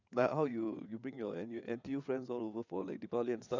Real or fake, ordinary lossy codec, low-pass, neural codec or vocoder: fake; none; 7.2 kHz; vocoder, 22.05 kHz, 80 mel bands, WaveNeXt